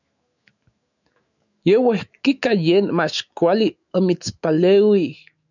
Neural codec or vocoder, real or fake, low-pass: autoencoder, 48 kHz, 128 numbers a frame, DAC-VAE, trained on Japanese speech; fake; 7.2 kHz